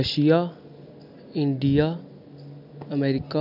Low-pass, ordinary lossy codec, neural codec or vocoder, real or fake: 5.4 kHz; none; none; real